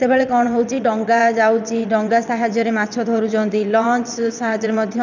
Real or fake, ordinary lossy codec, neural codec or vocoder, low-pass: fake; none; vocoder, 22.05 kHz, 80 mel bands, WaveNeXt; 7.2 kHz